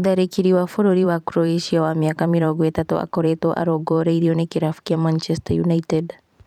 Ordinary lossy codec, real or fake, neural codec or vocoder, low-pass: none; fake; vocoder, 44.1 kHz, 128 mel bands every 512 samples, BigVGAN v2; 19.8 kHz